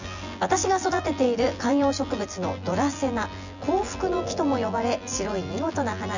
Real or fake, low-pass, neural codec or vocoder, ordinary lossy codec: fake; 7.2 kHz; vocoder, 24 kHz, 100 mel bands, Vocos; none